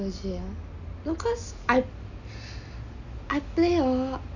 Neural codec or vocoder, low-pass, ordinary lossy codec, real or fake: none; 7.2 kHz; none; real